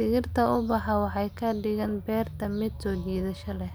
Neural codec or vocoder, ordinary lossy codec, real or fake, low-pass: none; none; real; none